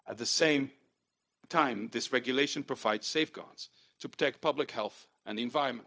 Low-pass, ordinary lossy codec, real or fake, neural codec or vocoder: none; none; fake; codec, 16 kHz, 0.4 kbps, LongCat-Audio-Codec